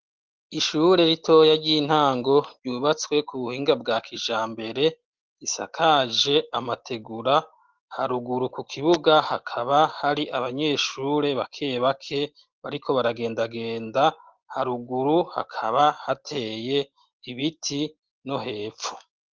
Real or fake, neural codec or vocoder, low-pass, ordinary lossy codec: real; none; 7.2 kHz; Opus, 16 kbps